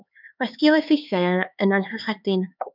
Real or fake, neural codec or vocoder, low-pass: fake; codec, 16 kHz, 4 kbps, X-Codec, HuBERT features, trained on LibriSpeech; 5.4 kHz